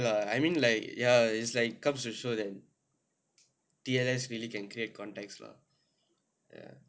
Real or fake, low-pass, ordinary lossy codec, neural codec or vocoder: real; none; none; none